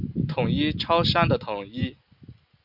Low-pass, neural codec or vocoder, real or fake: 5.4 kHz; none; real